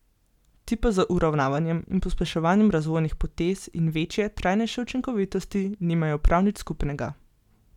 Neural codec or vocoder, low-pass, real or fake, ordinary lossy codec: none; 19.8 kHz; real; none